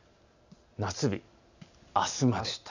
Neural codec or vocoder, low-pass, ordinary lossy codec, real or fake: none; 7.2 kHz; none; real